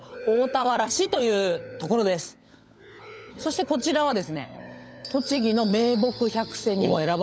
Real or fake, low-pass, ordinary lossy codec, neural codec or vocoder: fake; none; none; codec, 16 kHz, 16 kbps, FunCodec, trained on LibriTTS, 50 frames a second